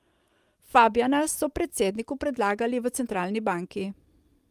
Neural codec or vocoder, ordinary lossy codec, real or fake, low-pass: none; Opus, 32 kbps; real; 14.4 kHz